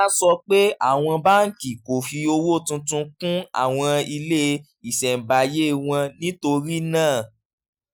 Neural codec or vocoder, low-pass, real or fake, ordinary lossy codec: none; none; real; none